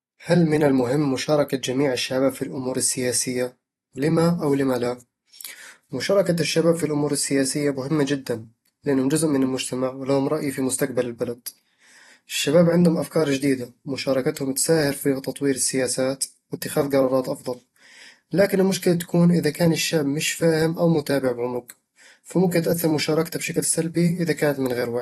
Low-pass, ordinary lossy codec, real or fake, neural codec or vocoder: 19.8 kHz; AAC, 32 kbps; fake; vocoder, 44.1 kHz, 128 mel bands every 256 samples, BigVGAN v2